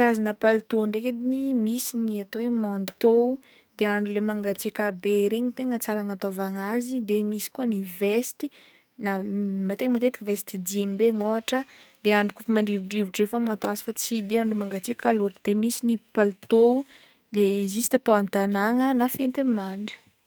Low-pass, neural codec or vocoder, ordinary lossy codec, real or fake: none; codec, 44.1 kHz, 2.6 kbps, SNAC; none; fake